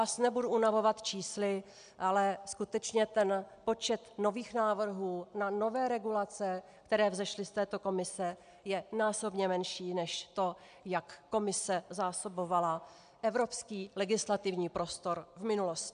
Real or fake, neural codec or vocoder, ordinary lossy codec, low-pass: real; none; MP3, 96 kbps; 9.9 kHz